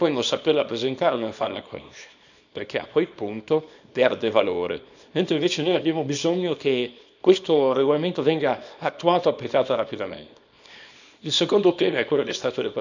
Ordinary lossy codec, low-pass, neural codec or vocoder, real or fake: none; 7.2 kHz; codec, 24 kHz, 0.9 kbps, WavTokenizer, small release; fake